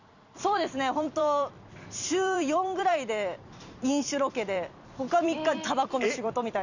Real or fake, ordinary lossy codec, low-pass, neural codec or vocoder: fake; none; 7.2 kHz; vocoder, 44.1 kHz, 128 mel bands every 256 samples, BigVGAN v2